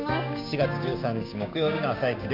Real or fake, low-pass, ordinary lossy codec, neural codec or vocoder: fake; 5.4 kHz; none; codec, 16 kHz, 6 kbps, DAC